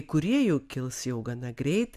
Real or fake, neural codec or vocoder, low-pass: real; none; 14.4 kHz